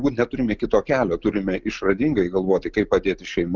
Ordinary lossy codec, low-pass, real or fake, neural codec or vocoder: Opus, 24 kbps; 7.2 kHz; real; none